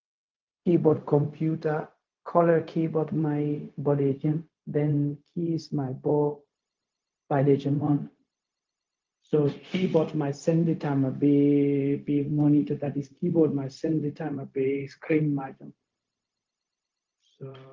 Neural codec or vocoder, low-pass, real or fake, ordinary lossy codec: codec, 16 kHz, 0.4 kbps, LongCat-Audio-Codec; 7.2 kHz; fake; Opus, 32 kbps